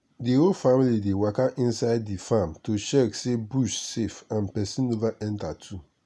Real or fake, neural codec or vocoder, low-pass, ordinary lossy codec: real; none; none; none